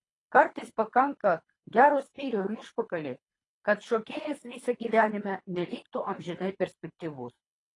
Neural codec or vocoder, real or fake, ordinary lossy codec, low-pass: codec, 24 kHz, 3 kbps, HILCodec; fake; AAC, 32 kbps; 10.8 kHz